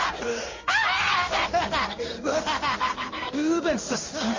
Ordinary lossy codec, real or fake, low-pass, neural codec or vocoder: MP3, 32 kbps; fake; 7.2 kHz; codec, 16 kHz in and 24 kHz out, 1 kbps, XY-Tokenizer